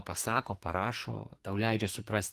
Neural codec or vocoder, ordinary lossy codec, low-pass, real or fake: codec, 44.1 kHz, 2.6 kbps, SNAC; Opus, 24 kbps; 14.4 kHz; fake